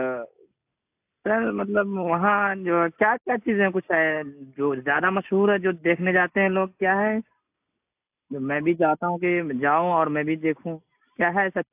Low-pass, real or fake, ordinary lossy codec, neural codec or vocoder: 3.6 kHz; real; AAC, 32 kbps; none